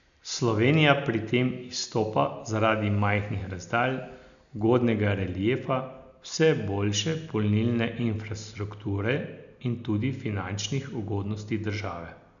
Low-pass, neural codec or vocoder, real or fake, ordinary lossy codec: 7.2 kHz; none; real; none